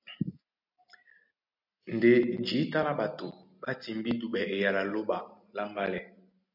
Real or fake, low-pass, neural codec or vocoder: real; 5.4 kHz; none